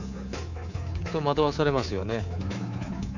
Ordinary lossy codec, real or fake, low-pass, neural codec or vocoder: none; fake; 7.2 kHz; codec, 24 kHz, 3.1 kbps, DualCodec